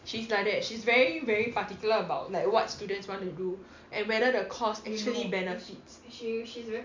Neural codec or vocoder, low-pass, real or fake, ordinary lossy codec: none; 7.2 kHz; real; MP3, 64 kbps